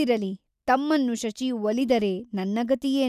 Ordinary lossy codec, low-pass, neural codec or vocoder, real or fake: none; 14.4 kHz; none; real